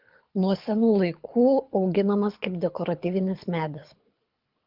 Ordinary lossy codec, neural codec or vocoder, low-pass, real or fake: Opus, 32 kbps; codec, 24 kHz, 6 kbps, HILCodec; 5.4 kHz; fake